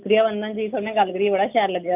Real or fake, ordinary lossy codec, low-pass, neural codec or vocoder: real; none; 3.6 kHz; none